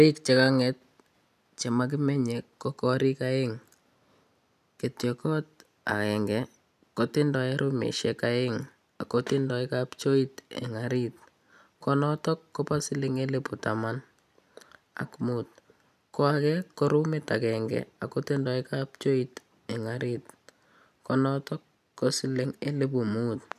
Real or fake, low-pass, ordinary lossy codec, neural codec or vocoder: real; 14.4 kHz; none; none